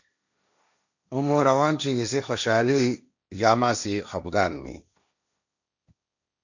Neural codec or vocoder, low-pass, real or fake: codec, 16 kHz, 1.1 kbps, Voila-Tokenizer; 7.2 kHz; fake